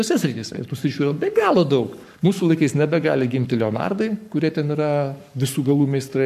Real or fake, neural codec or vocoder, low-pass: fake; codec, 44.1 kHz, 7.8 kbps, Pupu-Codec; 14.4 kHz